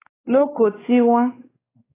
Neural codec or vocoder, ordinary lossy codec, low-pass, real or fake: codec, 16 kHz, 4 kbps, X-Codec, WavLM features, trained on Multilingual LibriSpeech; AAC, 16 kbps; 3.6 kHz; fake